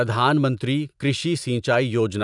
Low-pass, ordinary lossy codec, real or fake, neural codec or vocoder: 10.8 kHz; none; real; none